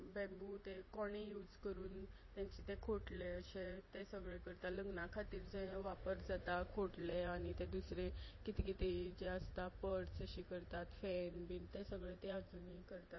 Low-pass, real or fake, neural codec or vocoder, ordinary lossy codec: 7.2 kHz; fake; vocoder, 44.1 kHz, 80 mel bands, Vocos; MP3, 24 kbps